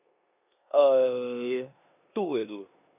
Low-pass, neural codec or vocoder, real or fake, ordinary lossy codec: 3.6 kHz; codec, 16 kHz in and 24 kHz out, 0.9 kbps, LongCat-Audio-Codec, four codebook decoder; fake; none